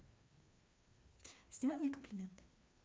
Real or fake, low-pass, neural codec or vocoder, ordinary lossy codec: fake; none; codec, 16 kHz, 2 kbps, FreqCodec, larger model; none